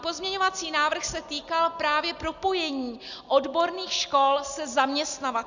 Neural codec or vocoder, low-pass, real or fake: none; 7.2 kHz; real